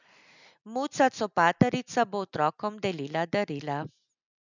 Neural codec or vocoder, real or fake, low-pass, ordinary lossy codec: none; real; 7.2 kHz; none